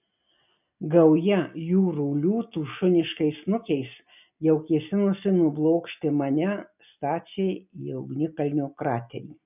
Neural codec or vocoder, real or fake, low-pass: none; real; 3.6 kHz